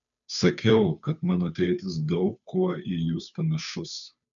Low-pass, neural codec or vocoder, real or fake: 7.2 kHz; codec, 16 kHz, 2 kbps, FunCodec, trained on Chinese and English, 25 frames a second; fake